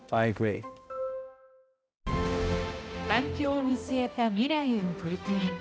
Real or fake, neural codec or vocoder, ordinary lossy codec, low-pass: fake; codec, 16 kHz, 0.5 kbps, X-Codec, HuBERT features, trained on balanced general audio; none; none